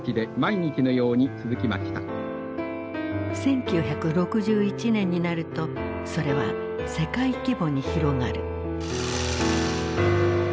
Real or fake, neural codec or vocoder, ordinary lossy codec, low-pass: real; none; none; none